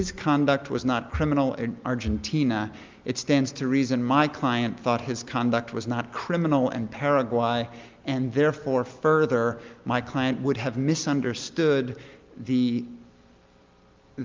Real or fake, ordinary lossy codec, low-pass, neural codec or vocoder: real; Opus, 24 kbps; 7.2 kHz; none